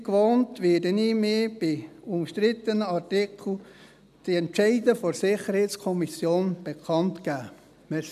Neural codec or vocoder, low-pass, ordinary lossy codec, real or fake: none; 14.4 kHz; none; real